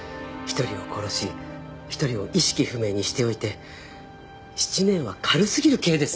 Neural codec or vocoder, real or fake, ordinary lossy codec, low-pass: none; real; none; none